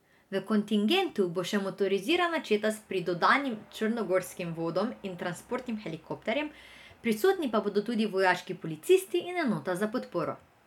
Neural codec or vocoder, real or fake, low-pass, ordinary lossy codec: none; real; 19.8 kHz; none